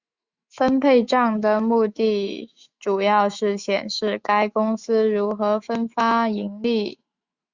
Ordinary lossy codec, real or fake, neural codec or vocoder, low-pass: Opus, 64 kbps; fake; autoencoder, 48 kHz, 128 numbers a frame, DAC-VAE, trained on Japanese speech; 7.2 kHz